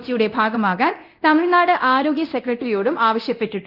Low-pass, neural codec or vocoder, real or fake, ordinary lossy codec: 5.4 kHz; codec, 24 kHz, 0.9 kbps, DualCodec; fake; Opus, 32 kbps